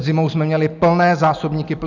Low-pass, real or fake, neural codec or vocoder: 7.2 kHz; real; none